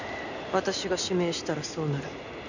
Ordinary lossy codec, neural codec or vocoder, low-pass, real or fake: none; none; 7.2 kHz; real